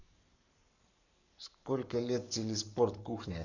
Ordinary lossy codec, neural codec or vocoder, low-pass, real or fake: none; codec, 44.1 kHz, 7.8 kbps, Pupu-Codec; 7.2 kHz; fake